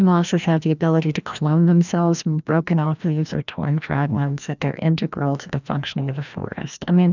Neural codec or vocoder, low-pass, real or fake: codec, 16 kHz, 1 kbps, FreqCodec, larger model; 7.2 kHz; fake